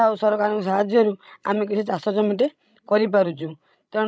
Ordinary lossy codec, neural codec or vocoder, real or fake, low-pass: none; codec, 16 kHz, 16 kbps, FreqCodec, larger model; fake; none